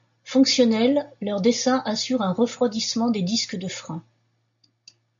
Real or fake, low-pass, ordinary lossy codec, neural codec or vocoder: real; 7.2 kHz; MP3, 64 kbps; none